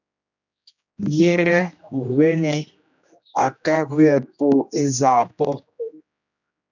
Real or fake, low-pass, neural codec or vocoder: fake; 7.2 kHz; codec, 16 kHz, 1 kbps, X-Codec, HuBERT features, trained on general audio